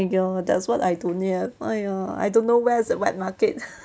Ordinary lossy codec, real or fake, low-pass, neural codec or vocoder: none; real; none; none